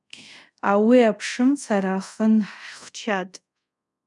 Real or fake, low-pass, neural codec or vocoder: fake; 10.8 kHz; codec, 24 kHz, 0.5 kbps, DualCodec